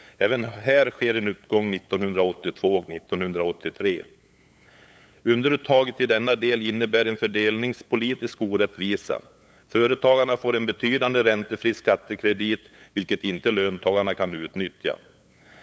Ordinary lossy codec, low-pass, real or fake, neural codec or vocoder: none; none; fake; codec, 16 kHz, 8 kbps, FunCodec, trained on LibriTTS, 25 frames a second